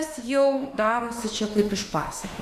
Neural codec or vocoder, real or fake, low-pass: autoencoder, 48 kHz, 32 numbers a frame, DAC-VAE, trained on Japanese speech; fake; 14.4 kHz